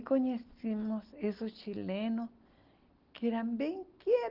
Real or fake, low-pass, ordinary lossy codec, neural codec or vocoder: real; 5.4 kHz; Opus, 16 kbps; none